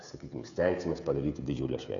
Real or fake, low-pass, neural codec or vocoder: fake; 7.2 kHz; codec, 16 kHz, 16 kbps, FreqCodec, smaller model